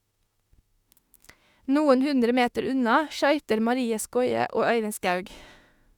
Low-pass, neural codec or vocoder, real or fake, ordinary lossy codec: 19.8 kHz; autoencoder, 48 kHz, 32 numbers a frame, DAC-VAE, trained on Japanese speech; fake; Opus, 64 kbps